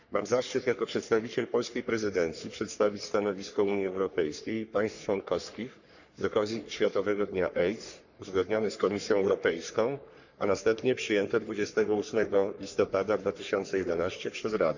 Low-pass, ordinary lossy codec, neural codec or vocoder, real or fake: 7.2 kHz; none; codec, 44.1 kHz, 3.4 kbps, Pupu-Codec; fake